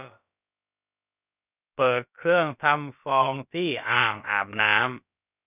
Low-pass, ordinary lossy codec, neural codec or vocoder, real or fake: 3.6 kHz; none; codec, 16 kHz, about 1 kbps, DyCAST, with the encoder's durations; fake